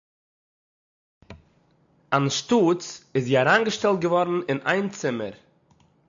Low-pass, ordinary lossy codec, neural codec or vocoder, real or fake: 7.2 kHz; AAC, 64 kbps; none; real